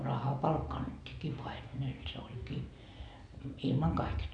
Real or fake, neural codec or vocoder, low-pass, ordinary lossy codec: real; none; 9.9 kHz; none